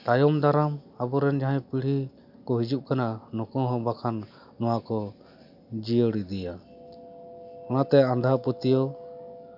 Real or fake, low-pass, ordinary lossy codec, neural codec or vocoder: real; 5.4 kHz; none; none